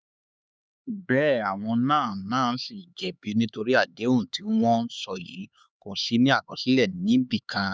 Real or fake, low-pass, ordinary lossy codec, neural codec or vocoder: fake; none; none; codec, 16 kHz, 4 kbps, X-Codec, HuBERT features, trained on LibriSpeech